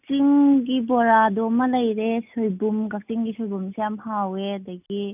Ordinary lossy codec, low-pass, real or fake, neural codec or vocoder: none; 3.6 kHz; real; none